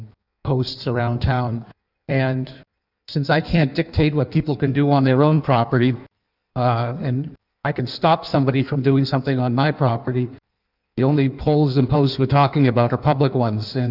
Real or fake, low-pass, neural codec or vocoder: fake; 5.4 kHz; codec, 16 kHz in and 24 kHz out, 1.1 kbps, FireRedTTS-2 codec